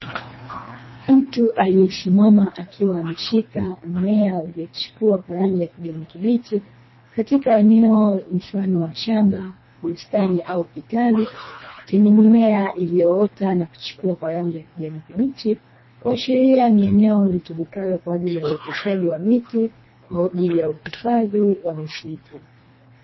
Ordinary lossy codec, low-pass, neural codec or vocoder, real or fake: MP3, 24 kbps; 7.2 kHz; codec, 24 kHz, 1.5 kbps, HILCodec; fake